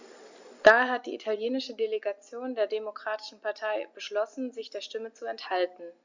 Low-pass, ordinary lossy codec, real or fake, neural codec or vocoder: 7.2 kHz; Opus, 64 kbps; real; none